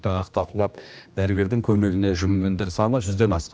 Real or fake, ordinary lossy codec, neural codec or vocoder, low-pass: fake; none; codec, 16 kHz, 1 kbps, X-Codec, HuBERT features, trained on general audio; none